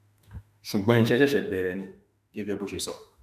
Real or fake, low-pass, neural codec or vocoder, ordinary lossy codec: fake; 14.4 kHz; autoencoder, 48 kHz, 32 numbers a frame, DAC-VAE, trained on Japanese speech; none